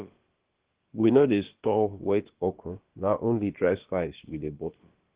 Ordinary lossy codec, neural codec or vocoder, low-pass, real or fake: Opus, 16 kbps; codec, 16 kHz, about 1 kbps, DyCAST, with the encoder's durations; 3.6 kHz; fake